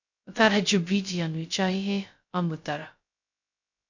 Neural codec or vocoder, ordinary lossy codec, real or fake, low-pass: codec, 16 kHz, 0.2 kbps, FocalCodec; none; fake; 7.2 kHz